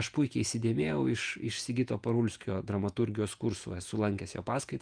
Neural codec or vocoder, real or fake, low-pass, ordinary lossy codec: vocoder, 48 kHz, 128 mel bands, Vocos; fake; 9.9 kHz; Opus, 32 kbps